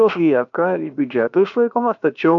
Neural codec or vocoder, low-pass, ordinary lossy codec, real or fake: codec, 16 kHz, 0.7 kbps, FocalCodec; 7.2 kHz; MP3, 96 kbps; fake